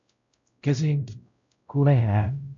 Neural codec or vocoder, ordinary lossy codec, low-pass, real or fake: codec, 16 kHz, 0.5 kbps, X-Codec, WavLM features, trained on Multilingual LibriSpeech; AAC, 64 kbps; 7.2 kHz; fake